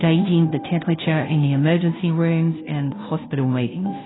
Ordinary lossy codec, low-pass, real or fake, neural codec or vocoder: AAC, 16 kbps; 7.2 kHz; fake; codec, 16 kHz, 0.5 kbps, FunCodec, trained on Chinese and English, 25 frames a second